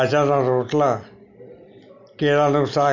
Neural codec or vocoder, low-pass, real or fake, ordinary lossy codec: none; 7.2 kHz; real; none